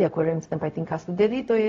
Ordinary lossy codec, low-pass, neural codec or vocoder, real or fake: AAC, 32 kbps; 7.2 kHz; codec, 16 kHz, 0.4 kbps, LongCat-Audio-Codec; fake